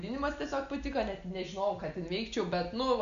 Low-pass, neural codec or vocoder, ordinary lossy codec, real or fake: 7.2 kHz; none; Opus, 64 kbps; real